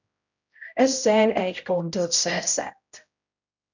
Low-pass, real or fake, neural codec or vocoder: 7.2 kHz; fake; codec, 16 kHz, 0.5 kbps, X-Codec, HuBERT features, trained on general audio